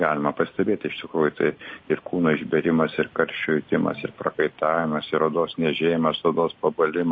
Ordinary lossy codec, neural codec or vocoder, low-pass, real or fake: MP3, 32 kbps; none; 7.2 kHz; real